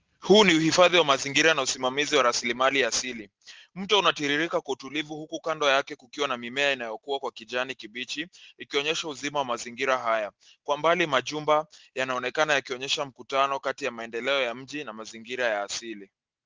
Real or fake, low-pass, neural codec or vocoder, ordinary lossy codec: real; 7.2 kHz; none; Opus, 16 kbps